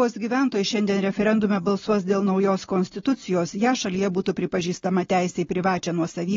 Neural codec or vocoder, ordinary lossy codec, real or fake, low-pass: none; AAC, 24 kbps; real; 7.2 kHz